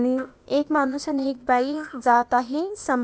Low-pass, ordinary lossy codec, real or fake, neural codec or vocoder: none; none; fake; codec, 16 kHz, 0.8 kbps, ZipCodec